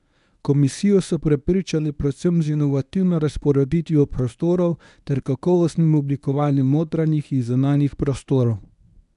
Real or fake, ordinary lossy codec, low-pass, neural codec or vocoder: fake; none; 10.8 kHz; codec, 24 kHz, 0.9 kbps, WavTokenizer, medium speech release version 1